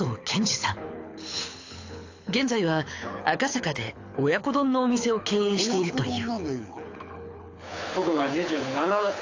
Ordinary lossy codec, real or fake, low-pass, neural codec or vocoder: AAC, 48 kbps; fake; 7.2 kHz; codec, 24 kHz, 6 kbps, HILCodec